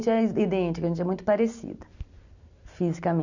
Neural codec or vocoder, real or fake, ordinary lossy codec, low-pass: none; real; none; 7.2 kHz